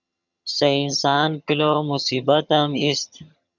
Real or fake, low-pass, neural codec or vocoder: fake; 7.2 kHz; vocoder, 22.05 kHz, 80 mel bands, HiFi-GAN